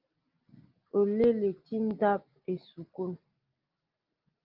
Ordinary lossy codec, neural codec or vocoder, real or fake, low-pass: Opus, 32 kbps; none; real; 5.4 kHz